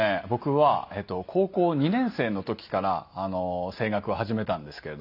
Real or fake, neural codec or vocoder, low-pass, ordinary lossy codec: real; none; 5.4 kHz; none